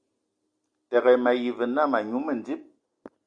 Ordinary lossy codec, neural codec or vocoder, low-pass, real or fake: Opus, 64 kbps; none; 9.9 kHz; real